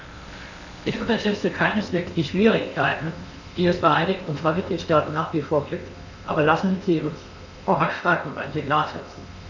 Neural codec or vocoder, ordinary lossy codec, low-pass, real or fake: codec, 16 kHz in and 24 kHz out, 0.8 kbps, FocalCodec, streaming, 65536 codes; none; 7.2 kHz; fake